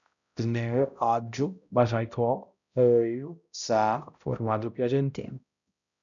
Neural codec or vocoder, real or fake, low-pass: codec, 16 kHz, 0.5 kbps, X-Codec, HuBERT features, trained on balanced general audio; fake; 7.2 kHz